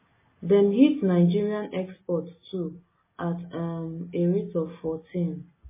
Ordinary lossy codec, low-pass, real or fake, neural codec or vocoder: MP3, 16 kbps; 3.6 kHz; real; none